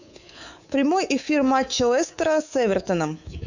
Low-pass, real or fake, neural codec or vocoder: 7.2 kHz; fake; codec, 24 kHz, 3.1 kbps, DualCodec